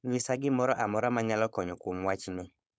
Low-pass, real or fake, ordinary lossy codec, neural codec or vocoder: none; fake; none; codec, 16 kHz, 4.8 kbps, FACodec